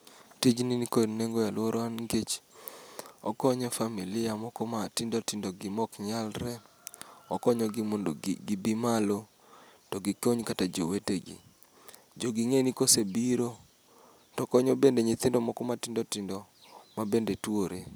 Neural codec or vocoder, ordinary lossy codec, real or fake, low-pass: none; none; real; none